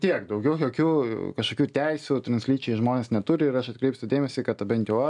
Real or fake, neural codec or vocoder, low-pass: real; none; 10.8 kHz